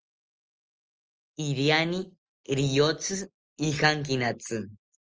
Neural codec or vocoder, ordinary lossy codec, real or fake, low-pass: none; Opus, 24 kbps; real; 7.2 kHz